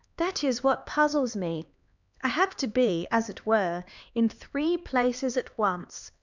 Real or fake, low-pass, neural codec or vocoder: fake; 7.2 kHz; codec, 16 kHz, 2 kbps, X-Codec, HuBERT features, trained on LibriSpeech